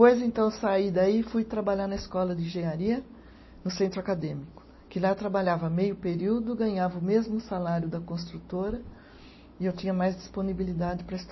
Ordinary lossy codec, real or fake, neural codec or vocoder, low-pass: MP3, 24 kbps; real; none; 7.2 kHz